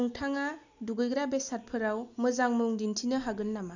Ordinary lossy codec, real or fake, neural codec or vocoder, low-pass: none; real; none; 7.2 kHz